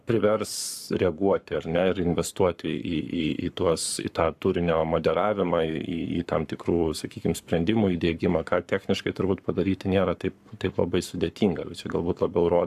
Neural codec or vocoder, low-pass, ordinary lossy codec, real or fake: codec, 44.1 kHz, 7.8 kbps, Pupu-Codec; 14.4 kHz; AAC, 96 kbps; fake